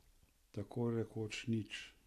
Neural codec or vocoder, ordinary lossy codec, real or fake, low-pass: none; none; real; 14.4 kHz